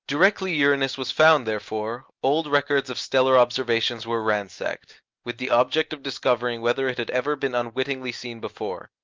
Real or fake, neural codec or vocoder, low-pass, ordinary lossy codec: real; none; 7.2 kHz; Opus, 32 kbps